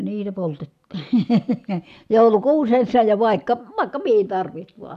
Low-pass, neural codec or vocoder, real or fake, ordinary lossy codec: 14.4 kHz; none; real; none